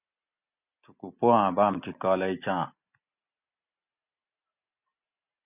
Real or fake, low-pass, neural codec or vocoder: real; 3.6 kHz; none